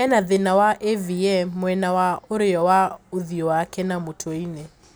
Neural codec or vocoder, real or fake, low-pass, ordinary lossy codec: none; real; none; none